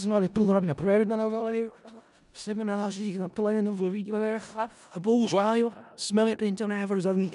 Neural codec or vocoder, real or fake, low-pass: codec, 16 kHz in and 24 kHz out, 0.4 kbps, LongCat-Audio-Codec, four codebook decoder; fake; 10.8 kHz